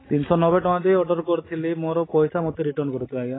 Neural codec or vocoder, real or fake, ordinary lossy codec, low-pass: none; real; AAC, 16 kbps; 7.2 kHz